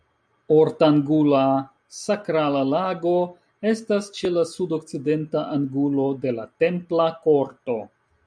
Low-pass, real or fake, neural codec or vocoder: 9.9 kHz; real; none